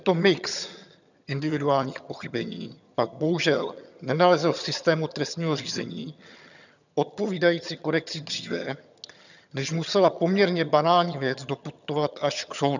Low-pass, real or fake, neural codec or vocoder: 7.2 kHz; fake; vocoder, 22.05 kHz, 80 mel bands, HiFi-GAN